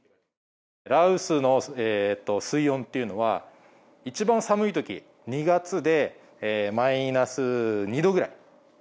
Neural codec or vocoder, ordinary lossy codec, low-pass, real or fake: none; none; none; real